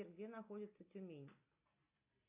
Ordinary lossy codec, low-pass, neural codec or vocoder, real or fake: AAC, 32 kbps; 3.6 kHz; none; real